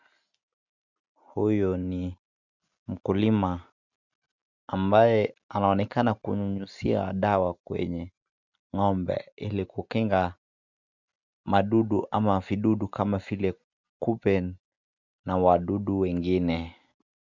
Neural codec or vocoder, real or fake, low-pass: none; real; 7.2 kHz